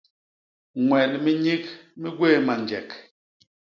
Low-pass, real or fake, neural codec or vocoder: 7.2 kHz; real; none